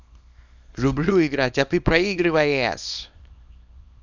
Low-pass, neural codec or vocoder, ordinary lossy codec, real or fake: 7.2 kHz; codec, 24 kHz, 0.9 kbps, WavTokenizer, small release; none; fake